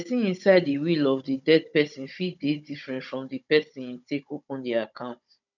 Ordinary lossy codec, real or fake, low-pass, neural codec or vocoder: none; fake; 7.2 kHz; codec, 16 kHz, 16 kbps, FunCodec, trained on Chinese and English, 50 frames a second